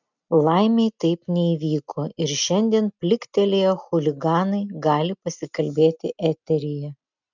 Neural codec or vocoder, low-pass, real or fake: none; 7.2 kHz; real